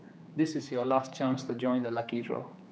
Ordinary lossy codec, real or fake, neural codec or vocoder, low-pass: none; fake; codec, 16 kHz, 4 kbps, X-Codec, HuBERT features, trained on general audio; none